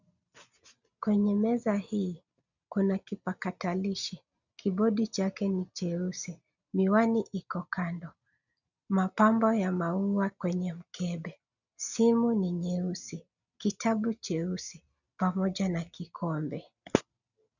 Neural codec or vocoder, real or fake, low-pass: none; real; 7.2 kHz